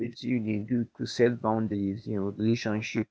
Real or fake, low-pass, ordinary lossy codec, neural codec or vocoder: fake; none; none; codec, 16 kHz, 0.8 kbps, ZipCodec